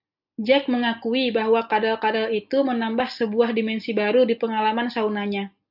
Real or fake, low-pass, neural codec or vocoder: real; 5.4 kHz; none